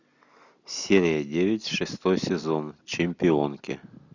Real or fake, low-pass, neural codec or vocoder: real; 7.2 kHz; none